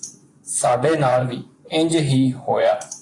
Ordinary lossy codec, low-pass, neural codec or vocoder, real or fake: AAC, 48 kbps; 10.8 kHz; vocoder, 24 kHz, 100 mel bands, Vocos; fake